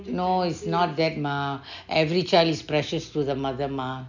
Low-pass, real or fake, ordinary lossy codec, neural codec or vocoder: 7.2 kHz; real; none; none